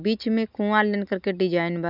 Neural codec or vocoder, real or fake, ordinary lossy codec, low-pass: none; real; none; 5.4 kHz